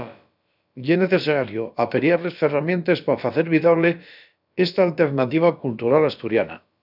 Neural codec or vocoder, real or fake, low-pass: codec, 16 kHz, about 1 kbps, DyCAST, with the encoder's durations; fake; 5.4 kHz